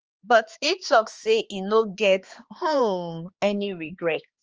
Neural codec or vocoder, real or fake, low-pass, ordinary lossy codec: codec, 16 kHz, 2 kbps, X-Codec, HuBERT features, trained on balanced general audio; fake; 7.2 kHz; Opus, 24 kbps